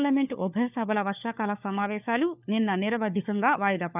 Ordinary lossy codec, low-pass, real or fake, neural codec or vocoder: none; 3.6 kHz; fake; codec, 16 kHz, 4 kbps, FunCodec, trained on LibriTTS, 50 frames a second